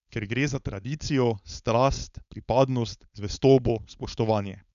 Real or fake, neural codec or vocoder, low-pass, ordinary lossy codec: fake; codec, 16 kHz, 4.8 kbps, FACodec; 7.2 kHz; none